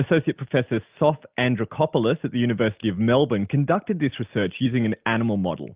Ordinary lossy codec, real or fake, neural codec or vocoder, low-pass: Opus, 24 kbps; real; none; 3.6 kHz